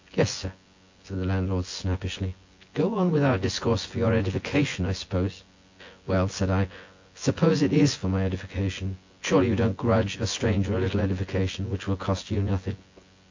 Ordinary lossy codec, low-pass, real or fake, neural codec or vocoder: AAC, 48 kbps; 7.2 kHz; fake; vocoder, 24 kHz, 100 mel bands, Vocos